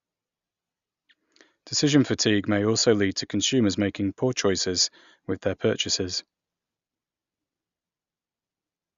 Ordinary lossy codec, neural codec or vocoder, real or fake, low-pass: Opus, 64 kbps; none; real; 7.2 kHz